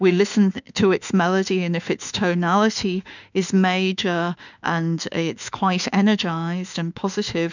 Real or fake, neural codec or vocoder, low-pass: fake; autoencoder, 48 kHz, 32 numbers a frame, DAC-VAE, trained on Japanese speech; 7.2 kHz